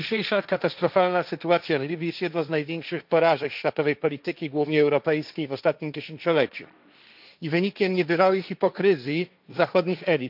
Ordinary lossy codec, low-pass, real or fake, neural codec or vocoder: none; 5.4 kHz; fake; codec, 16 kHz, 1.1 kbps, Voila-Tokenizer